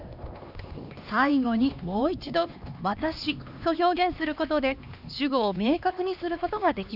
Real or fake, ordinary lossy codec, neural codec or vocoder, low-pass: fake; none; codec, 16 kHz, 2 kbps, X-Codec, HuBERT features, trained on LibriSpeech; 5.4 kHz